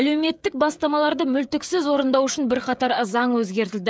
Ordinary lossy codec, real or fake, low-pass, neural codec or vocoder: none; fake; none; codec, 16 kHz, 16 kbps, FreqCodec, smaller model